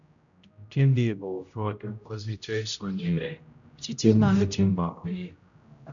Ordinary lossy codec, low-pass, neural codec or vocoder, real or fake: none; 7.2 kHz; codec, 16 kHz, 0.5 kbps, X-Codec, HuBERT features, trained on general audio; fake